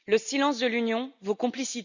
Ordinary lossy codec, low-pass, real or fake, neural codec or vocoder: none; 7.2 kHz; real; none